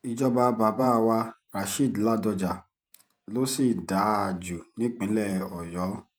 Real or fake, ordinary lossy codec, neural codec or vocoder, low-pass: fake; none; vocoder, 48 kHz, 128 mel bands, Vocos; none